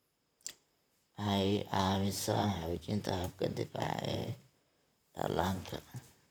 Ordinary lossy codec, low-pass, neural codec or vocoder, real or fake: none; none; vocoder, 44.1 kHz, 128 mel bands, Pupu-Vocoder; fake